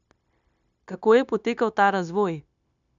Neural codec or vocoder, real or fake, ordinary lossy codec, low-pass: codec, 16 kHz, 0.9 kbps, LongCat-Audio-Codec; fake; none; 7.2 kHz